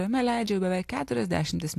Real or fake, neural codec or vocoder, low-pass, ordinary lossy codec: real; none; 14.4 kHz; AAC, 64 kbps